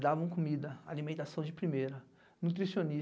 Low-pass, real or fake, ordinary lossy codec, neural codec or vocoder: none; real; none; none